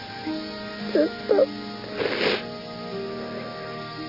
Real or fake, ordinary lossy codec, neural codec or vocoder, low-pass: real; none; none; 5.4 kHz